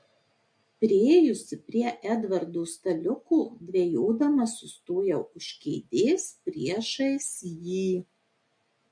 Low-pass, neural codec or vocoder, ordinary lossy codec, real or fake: 9.9 kHz; none; MP3, 48 kbps; real